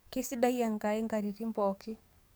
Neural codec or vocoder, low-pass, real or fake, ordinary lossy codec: codec, 44.1 kHz, 7.8 kbps, DAC; none; fake; none